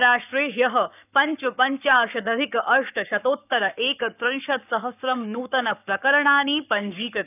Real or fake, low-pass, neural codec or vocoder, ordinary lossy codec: fake; 3.6 kHz; codec, 44.1 kHz, 7.8 kbps, Pupu-Codec; none